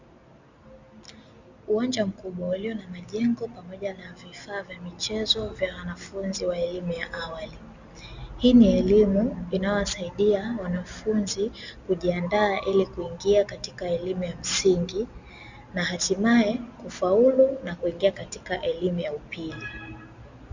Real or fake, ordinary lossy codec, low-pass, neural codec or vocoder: real; Opus, 64 kbps; 7.2 kHz; none